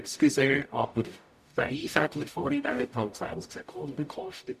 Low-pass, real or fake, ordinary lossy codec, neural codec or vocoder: 14.4 kHz; fake; none; codec, 44.1 kHz, 0.9 kbps, DAC